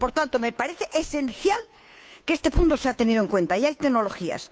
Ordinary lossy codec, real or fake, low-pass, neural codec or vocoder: none; fake; none; codec, 16 kHz, 2 kbps, FunCodec, trained on Chinese and English, 25 frames a second